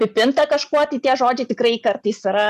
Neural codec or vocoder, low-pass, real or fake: none; 14.4 kHz; real